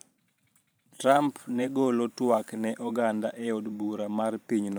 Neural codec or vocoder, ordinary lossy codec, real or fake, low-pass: vocoder, 44.1 kHz, 128 mel bands every 512 samples, BigVGAN v2; none; fake; none